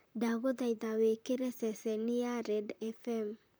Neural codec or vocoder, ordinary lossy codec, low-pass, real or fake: vocoder, 44.1 kHz, 128 mel bands, Pupu-Vocoder; none; none; fake